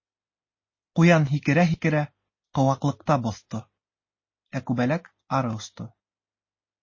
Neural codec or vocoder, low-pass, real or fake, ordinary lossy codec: none; 7.2 kHz; real; MP3, 32 kbps